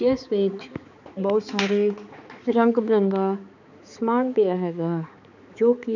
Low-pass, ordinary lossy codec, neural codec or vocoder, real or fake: 7.2 kHz; none; codec, 16 kHz, 4 kbps, X-Codec, HuBERT features, trained on balanced general audio; fake